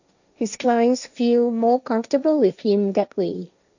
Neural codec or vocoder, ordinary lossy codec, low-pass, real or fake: codec, 16 kHz, 1.1 kbps, Voila-Tokenizer; none; 7.2 kHz; fake